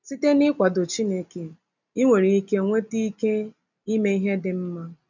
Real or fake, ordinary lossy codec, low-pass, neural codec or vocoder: real; none; 7.2 kHz; none